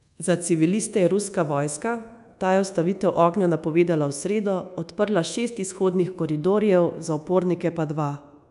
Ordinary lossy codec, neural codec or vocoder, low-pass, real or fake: none; codec, 24 kHz, 1.2 kbps, DualCodec; 10.8 kHz; fake